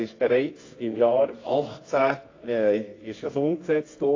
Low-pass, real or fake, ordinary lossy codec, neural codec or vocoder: 7.2 kHz; fake; AAC, 32 kbps; codec, 24 kHz, 0.9 kbps, WavTokenizer, medium music audio release